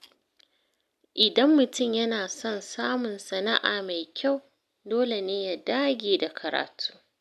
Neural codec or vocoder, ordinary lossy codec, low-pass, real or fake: none; none; 14.4 kHz; real